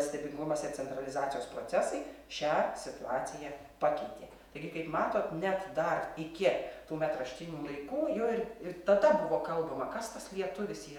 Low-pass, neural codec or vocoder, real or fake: 19.8 kHz; vocoder, 48 kHz, 128 mel bands, Vocos; fake